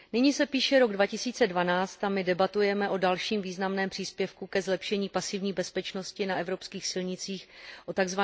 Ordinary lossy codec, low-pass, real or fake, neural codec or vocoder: none; none; real; none